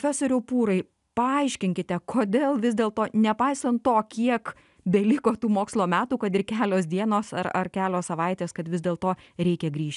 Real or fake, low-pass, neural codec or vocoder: real; 10.8 kHz; none